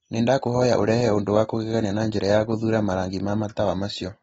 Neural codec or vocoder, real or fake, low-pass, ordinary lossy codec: none; real; 19.8 kHz; AAC, 24 kbps